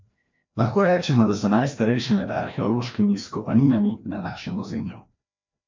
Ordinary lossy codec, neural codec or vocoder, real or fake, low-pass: MP3, 48 kbps; codec, 16 kHz, 1 kbps, FreqCodec, larger model; fake; 7.2 kHz